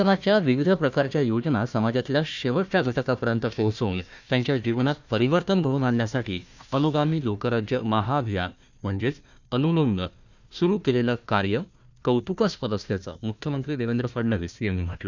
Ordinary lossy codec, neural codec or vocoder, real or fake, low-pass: none; codec, 16 kHz, 1 kbps, FunCodec, trained on Chinese and English, 50 frames a second; fake; 7.2 kHz